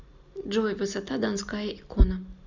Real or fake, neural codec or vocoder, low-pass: real; none; 7.2 kHz